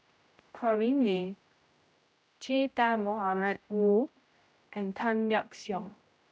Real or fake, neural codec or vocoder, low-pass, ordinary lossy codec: fake; codec, 16 kHz, 0.5 kbps, X-Codec, HuBERT features, trained on general audio; none; none